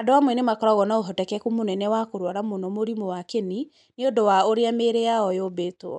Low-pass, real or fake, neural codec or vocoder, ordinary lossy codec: 10.8 kHz; real; none; MP3, 96 kbps